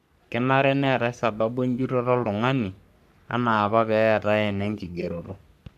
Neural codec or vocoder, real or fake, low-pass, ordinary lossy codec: codec, 44.1 kHz, 3.4 kbps, Pupu-Codec; fake; 14.4 kHz; none